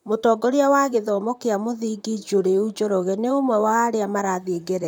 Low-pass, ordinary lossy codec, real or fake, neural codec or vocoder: none; none; fake; vocoder, 44.1 kHz, 128 mel bands, Pupu-Vocoder